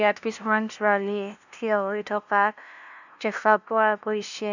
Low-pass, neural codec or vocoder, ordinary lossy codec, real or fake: 7.2 kHz; codec, 16 kHz, 0.5 kbps, FunCodec, trained on LibriTTS, 25 frames a second; none; fake